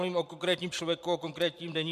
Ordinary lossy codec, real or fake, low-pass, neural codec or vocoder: MP3, 96 kbps; fake; 14.4 kHz; vocoder, 44.1 kHz, 128 mel bands every 512 samples, BigVGAN v2